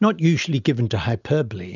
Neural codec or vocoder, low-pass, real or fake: none; 7.2 kHz; real